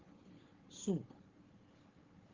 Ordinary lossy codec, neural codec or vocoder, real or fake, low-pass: Opus, 32 kbps; codec, 16 kHz, 4 kbps, FunCodec, trained on Chinese and English, 50 frames a second; fake; 7.2 kHz